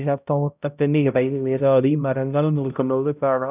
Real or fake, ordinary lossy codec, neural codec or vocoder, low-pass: fake; none; codec, 16 kHz, 0.5 kbps, X-Codec, HuBERT features, trained on balanced general audio; 3.6 kHz